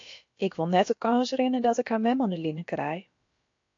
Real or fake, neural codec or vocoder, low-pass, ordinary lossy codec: fake; codec, 16 kHz, about 1 kbps, DyCAST, with the encoder's durations; 7.2 kHz; AAC, 48 kbps